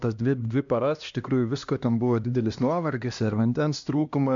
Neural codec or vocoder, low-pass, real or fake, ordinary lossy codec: codec, 16 kHz, 1 kbps, X-Codec, HuBERT features, trained on LibriSpeech; 7.2 kHz; fake; AAC, 64 kbps